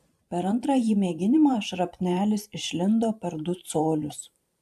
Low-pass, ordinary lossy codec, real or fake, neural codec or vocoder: 14.4 kHz; AAC, 96 kbps; fake; vocoder, 44.1 kHz, 128 mel bands every 512 samples, BigVGAN v2